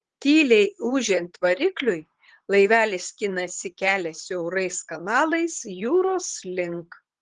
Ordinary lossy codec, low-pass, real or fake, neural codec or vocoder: Opus, 16 kbps; 10.8 kHz; fake; vocoder, 44.1 kHz, 128 mel bands, Pupu-Vocoder